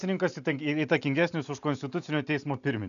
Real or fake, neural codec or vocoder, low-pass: real; none; 7.2 kHz